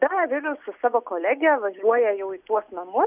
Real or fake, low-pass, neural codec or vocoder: real; 3.6 kHz; none